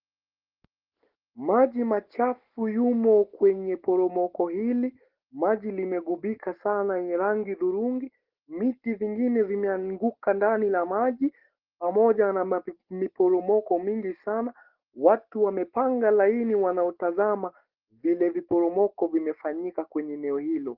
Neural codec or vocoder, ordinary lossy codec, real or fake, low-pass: none; Opus, 16 kbps; real; 5.4 kHz